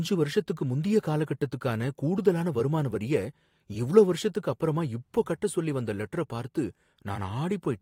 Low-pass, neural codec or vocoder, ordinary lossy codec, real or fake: 19.8 kHz; vocoder, 44.1 kHz, 128 mel bands every 256 samples, BigVGAN v2; AAC, 48 kbps; fake